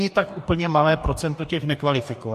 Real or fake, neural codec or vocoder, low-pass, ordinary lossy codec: fake; codec, 44.1 kHz, 2.6 kbps, DAC; 14.4 kHz; MP3, 64 kbps